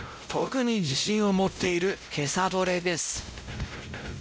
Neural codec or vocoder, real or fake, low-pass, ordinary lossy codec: codec, 16 kHz, 0.5 kbps, X-Codec, WavLM features, trained on Multilingual LibriSpeech; fake; none; none